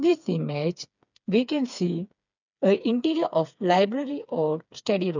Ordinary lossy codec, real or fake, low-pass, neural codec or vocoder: none; fake; 7.2 kHz; codec, 16 kHz, 4 kbps, FreqCodec, smaller model